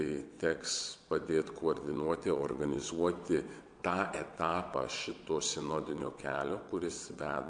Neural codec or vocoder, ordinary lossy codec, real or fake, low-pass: vocoder, 22.05 kHz, 80 mel bands, WaveNeXt; MP3, 64 kbps; fake; 9.9 kHz